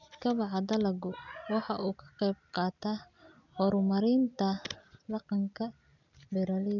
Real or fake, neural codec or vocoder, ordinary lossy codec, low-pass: real; none; none; 7.2 kHz